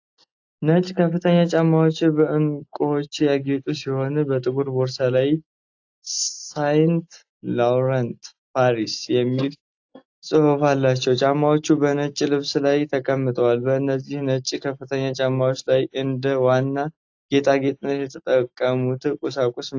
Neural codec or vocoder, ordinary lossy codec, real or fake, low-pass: none; AAC, 48 kbps; real; 7.2 kHz